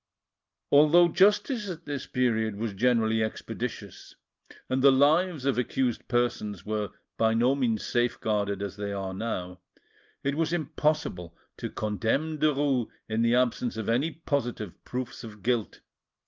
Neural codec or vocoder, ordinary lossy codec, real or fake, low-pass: none; Opus, 24 kbps; real; 7.2 kHz